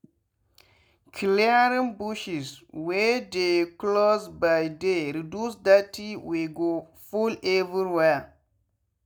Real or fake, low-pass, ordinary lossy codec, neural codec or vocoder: real; none; none; none